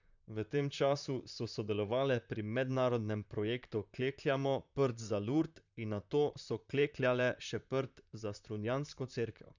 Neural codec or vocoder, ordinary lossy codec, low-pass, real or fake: vocoder, 44.1 kHz, 128 mel bands, Pupu-Vocoder; none; 7.2 kHz; fake